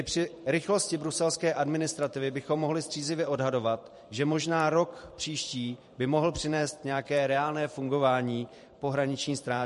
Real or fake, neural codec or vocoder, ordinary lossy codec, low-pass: fake; vocoder, 44.1 kHz, 128 mel bands every 512 samples, BigVGAN v2; MP3, 48 kbps; 14.4 kHz